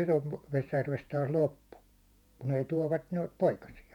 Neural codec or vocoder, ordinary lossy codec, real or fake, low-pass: vocoder, 48 kHz, 128 mel bands, Vocos; none; fake; 19.8 kHz